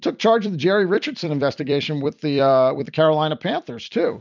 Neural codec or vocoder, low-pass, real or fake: vocoder, 44.1 kHz, 128 mel bands every 256 samples, BigVGAN v2; 7.2 kHz; fake